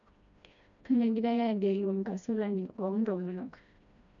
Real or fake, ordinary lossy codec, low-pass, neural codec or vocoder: fake; MP3, 96 kbps; 7.2 kHz; codec, 16 kHz, 1 kbps, FreqCodec, smaller model